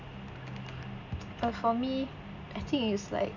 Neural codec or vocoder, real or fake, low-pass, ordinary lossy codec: none; real; 7.2 kHz; none